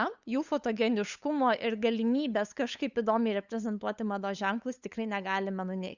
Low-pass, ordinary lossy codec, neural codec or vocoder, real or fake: 7.2 kHz; Opus, 64 kbps; codec, 16 kHz, 8 kbps, FunCodec, trained on LibriTTS, 25 frames a second; fake